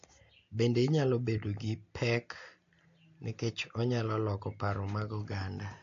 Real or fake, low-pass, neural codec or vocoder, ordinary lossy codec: real; 7.2 kHz; none; AAC, 48 kbps